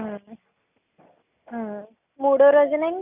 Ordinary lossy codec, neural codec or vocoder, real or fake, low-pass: none; none; real; 3.6 kHz